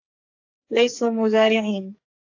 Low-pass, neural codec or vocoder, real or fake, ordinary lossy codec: 7.2 kHz; codec, 44.1 kHz, 2.6 kbps, SNAC; fake; AAC, 32 kbps